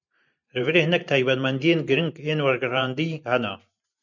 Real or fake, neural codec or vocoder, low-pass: fake; vocoder, 44.1 kHz, 128 mel bands every 512 samples, BigVGAN v2; 7.2 kHz